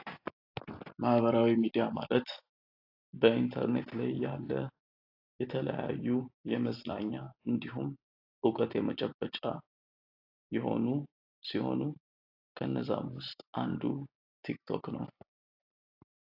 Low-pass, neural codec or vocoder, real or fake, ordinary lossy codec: 5.4 kHz; none; real; AAC, 32 kbps